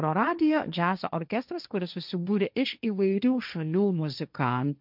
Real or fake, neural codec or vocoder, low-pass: fake; codec, 16 kHz, 1.1 kbps, Voila-Tokenizer; 5.4 kHz